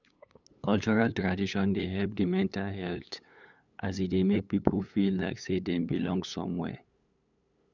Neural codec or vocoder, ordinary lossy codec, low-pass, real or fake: codec, 16 kHz, 8 kbps, FunCodec, trained on LibriTTS, 25 frames a second; none; 7.2 kHz; fake